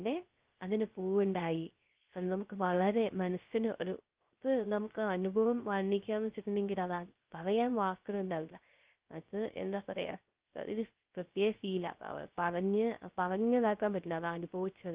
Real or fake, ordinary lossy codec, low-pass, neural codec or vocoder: fake; Opus, 32 kbps; 3.6 kHz; codec, 16 kHz, 0.3 kbps, FocalCodec